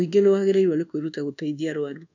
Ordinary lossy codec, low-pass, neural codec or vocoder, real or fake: none; 7.2 kHz; codec, 24 kHz, 1.2 kbps, DualCodec; fake